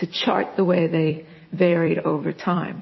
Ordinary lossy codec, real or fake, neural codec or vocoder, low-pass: MP3, 24 kbps; fake; vocoder, 44.1 kHz, 128 mel bands every 256 samples, BigVGAN v2; 7.2 kHz